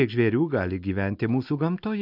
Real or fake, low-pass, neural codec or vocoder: real; 5.4 kHz; none